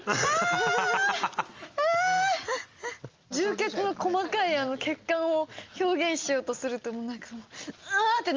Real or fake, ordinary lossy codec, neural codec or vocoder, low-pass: real; Opus, 32 kbps; none; 7.2 kHz